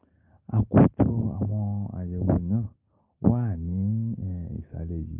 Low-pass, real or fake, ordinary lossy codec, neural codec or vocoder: 3.6 kHz; real; Opus, 24 kbps; none